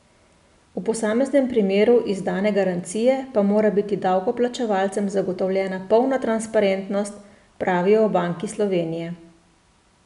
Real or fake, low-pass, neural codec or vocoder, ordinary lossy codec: real; 10.8 kHz; none; none